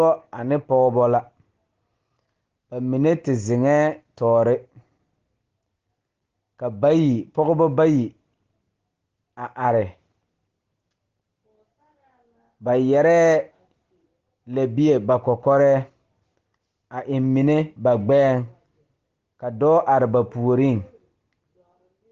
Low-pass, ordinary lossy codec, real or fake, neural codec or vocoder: 7.2 kHz; Opus, 16 kbps; real; none